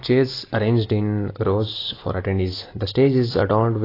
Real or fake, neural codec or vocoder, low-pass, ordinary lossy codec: real; none; 5.4 kHz; AAC, 24 kbps